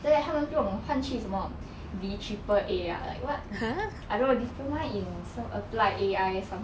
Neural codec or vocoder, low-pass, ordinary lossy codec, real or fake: none; none; none; real